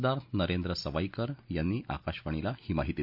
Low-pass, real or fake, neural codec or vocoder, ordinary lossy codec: 5.4 kHz; real; none; none